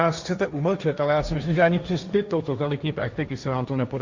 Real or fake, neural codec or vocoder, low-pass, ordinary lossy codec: fake; codec, 16 kHz, 1.1 kbps, Voila-Tokenizer; 7.2 kHz; Opus, 64 kbps